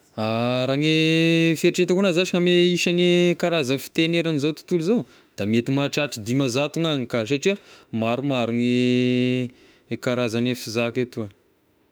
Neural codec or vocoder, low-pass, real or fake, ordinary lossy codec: autoencoder, 48 kHz, 32 numbers a frame, DAC-VAE, trained on Japanese speech; none; fake; none